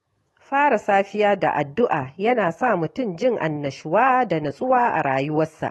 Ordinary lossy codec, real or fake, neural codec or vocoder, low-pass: AAC, 32 kbps; fake; autoencoder, 48 kHz, 128 numbers a frame, DAC-VAE, trained on Japanese speech; 19.8 kHz